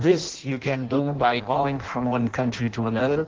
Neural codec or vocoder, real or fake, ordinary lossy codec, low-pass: codec, 16 kHz in and 24 kHz out, 0.6 kbps, FireRedTTS-2 codec; fake; Opus, 16 kbps; 7.2 kHz